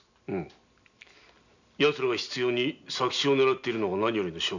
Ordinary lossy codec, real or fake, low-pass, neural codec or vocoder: none; real; 7.2 kHz; none